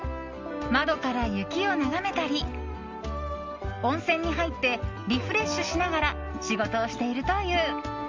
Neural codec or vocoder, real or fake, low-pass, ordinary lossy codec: none; real; 7.2 kHz; Opus, 32 kbps